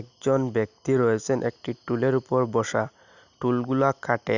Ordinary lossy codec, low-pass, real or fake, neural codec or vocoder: none; 7.2 kHz; real; none